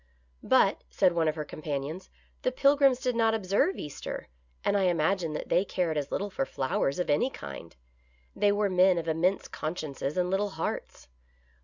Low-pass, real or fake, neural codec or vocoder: 7.2 kHz; real; none